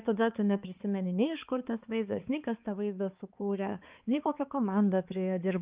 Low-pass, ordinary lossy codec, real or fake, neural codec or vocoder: 3.6 kHz; Opus, 24 kbps; fake; codec, 16 kHz, 4 kbps, X-Codec, HuBERT features, trained on balanced general audio